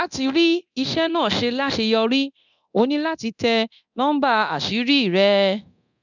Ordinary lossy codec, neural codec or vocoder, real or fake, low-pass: none; codec, 24 kHz, 0.9 kbps, DualCodec; fake; 7.2 kHz